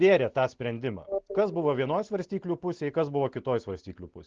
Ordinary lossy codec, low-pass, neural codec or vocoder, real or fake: Opus, 16 kbps; 7.2 kHz; none; real